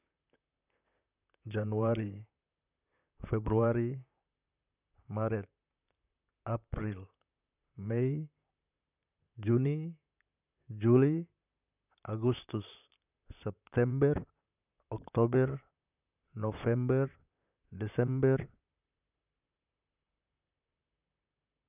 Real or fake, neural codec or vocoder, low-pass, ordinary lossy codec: fake; vocoder, 44.1 kHz, 128 mel bands, Pupu-Vocoder; 3.6 kHz; none